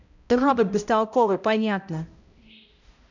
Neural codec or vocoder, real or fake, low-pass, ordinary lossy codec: codec, 16 kHz, 0.5 kbps, X-Codec, HuBERT features, trained on balanced general audio; fake; 7.2 kHz; none